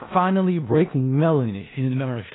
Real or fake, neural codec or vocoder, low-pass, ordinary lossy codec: fake; codec, 16 kHz in and 24 kHz out, 0.4 kbps, LongCat-Audio-Codec, four codebook decoder; 7.2 kHz; AAC, 16 kbps